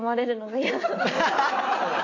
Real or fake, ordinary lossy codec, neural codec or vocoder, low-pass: real; AAC, 32 kbps; none; 7.2 kHz